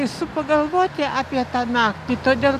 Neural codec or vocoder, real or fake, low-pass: none; real; 14.4 kHz